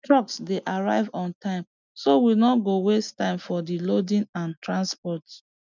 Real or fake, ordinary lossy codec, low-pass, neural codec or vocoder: real; none; 7.2 kHz; none